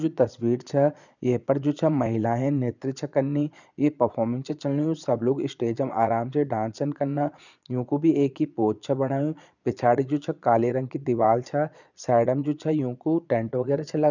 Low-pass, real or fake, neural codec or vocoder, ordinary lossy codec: 7.2 kHz; fake; vocoder, 22.05 kHz, 80 mel bands, Vocos; none